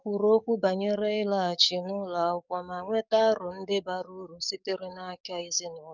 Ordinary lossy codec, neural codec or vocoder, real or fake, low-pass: none; codec, 44.1 kHz, 7.8 kbps, DAC; fake; 7.2 kHz